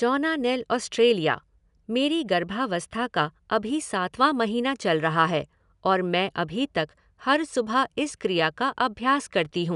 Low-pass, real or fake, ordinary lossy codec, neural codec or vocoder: 10.8 kHz; real; none; none